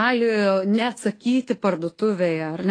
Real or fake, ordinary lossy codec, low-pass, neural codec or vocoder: fake; AAC, 32 kbps; 9.9 kHz; codec, 24 kHz, 1.2 kbps, DualCodec